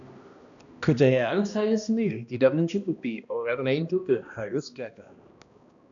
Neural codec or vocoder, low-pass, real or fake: codec, 16 kHz, 1 kbps, X-Codec, HuBERT features, trained on balanced general audio; 7.2 kHz; fake